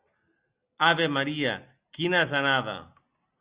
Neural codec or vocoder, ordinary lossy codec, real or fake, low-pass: none; Opus, 64 kbps; real; 3.6 kHz